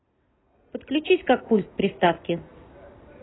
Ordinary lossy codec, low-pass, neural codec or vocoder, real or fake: AAC, 16 kbps; 7.2 kHz; none; real